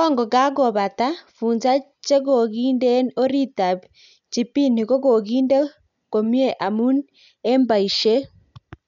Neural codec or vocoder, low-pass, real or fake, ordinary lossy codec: none; 7.2 kHz; real; none